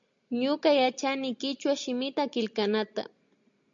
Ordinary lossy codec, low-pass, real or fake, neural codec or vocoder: MP3, 64 kbps; 7.2 kHz; real; none